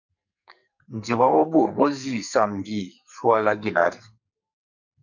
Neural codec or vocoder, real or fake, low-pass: codec, 44.1 kHz, 2.6 kbps, SNAC; fake; 7.2 kHz